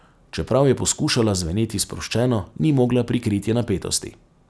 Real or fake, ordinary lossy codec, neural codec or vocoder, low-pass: real; none; none; none